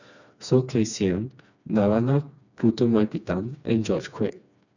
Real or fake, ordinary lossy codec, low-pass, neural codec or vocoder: fake; none; 7.2 kHz; codec, 16 kHz, 2 kbps, FreqCodec, smaller model